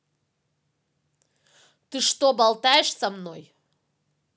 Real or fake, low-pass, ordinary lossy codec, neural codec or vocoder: real; none; none; none